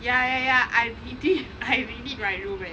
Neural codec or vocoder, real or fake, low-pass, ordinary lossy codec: none; real; none; none